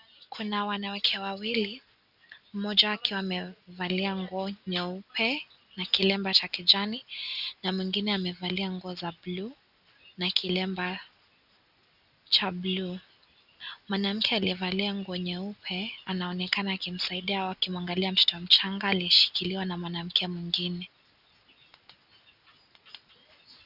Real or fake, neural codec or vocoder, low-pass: real; none; 5.4 kHz